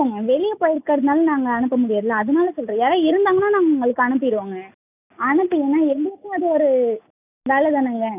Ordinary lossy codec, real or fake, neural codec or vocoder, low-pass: none; real; none; 3.6 kHz